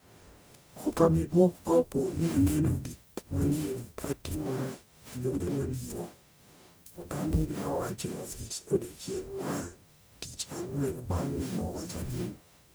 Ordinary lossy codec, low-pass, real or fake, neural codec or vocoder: none; none; fake; codec, 44.1 kHz, 0.9 kbps, DAC